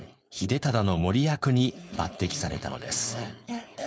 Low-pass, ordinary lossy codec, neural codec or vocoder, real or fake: none; none; codec, 16 kHz, 4.8 kbps, FACodec; fake